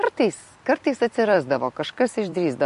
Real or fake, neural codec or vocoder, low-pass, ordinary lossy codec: fake; vocoder, 44.1 kHz, 128 mel bands every 256 samples, BigVGAN v2; 14.4 kHz; MP3, 48 kbps